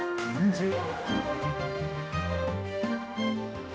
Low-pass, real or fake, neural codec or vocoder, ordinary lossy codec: none; fake; codec, 16 kHz, 1 kbps, X-Codec, HuBERT features, trained on general audio; none